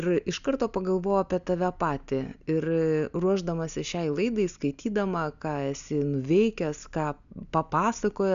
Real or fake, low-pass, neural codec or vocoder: real; 7.2 kHz; none